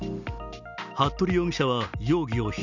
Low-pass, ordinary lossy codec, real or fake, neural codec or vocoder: 7.2 kHz; none; real; none